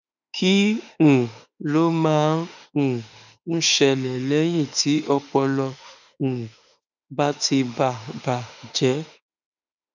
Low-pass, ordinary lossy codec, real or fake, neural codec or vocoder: 7.2 kHz; none; fake; autoencoder, 48 kHz, 32 numbers a frame, DAC-VAE, trained on Japanese speech